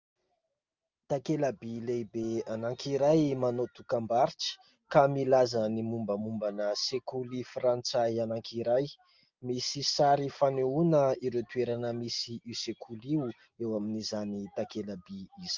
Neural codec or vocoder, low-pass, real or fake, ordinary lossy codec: none; 7.2 kHz; real; Opus, 32 kbps